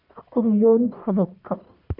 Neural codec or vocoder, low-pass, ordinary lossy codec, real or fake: codec, 44.1 kHz, 1.7 kbps, Pupu-Codec; 5.4 kHz; none; fake